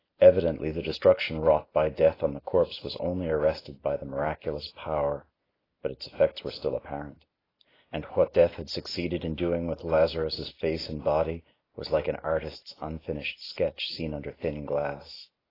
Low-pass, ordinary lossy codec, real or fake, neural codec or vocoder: 5.4 kHz; AAC, 24 kbps; real; none